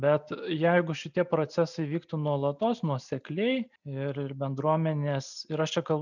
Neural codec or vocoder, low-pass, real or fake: none; 7.2 kHz; real